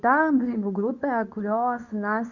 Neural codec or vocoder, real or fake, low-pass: codec, 24 kHz, 0.9 kbps, WavTokenizer, medium speech release version 2; fake; 7.2 kHz